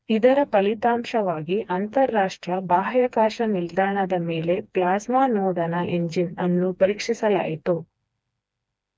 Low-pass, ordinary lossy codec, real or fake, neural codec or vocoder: none; none; fake; codec, 16 kHz, 2 kbps, FreqCodec, smaller model